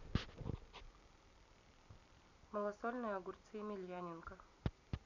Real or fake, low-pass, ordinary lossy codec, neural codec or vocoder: fake; 7.2 kHz; none; vocoder, 44.1 kHz, 128 mel bands every 256 samples, BigVGAN v2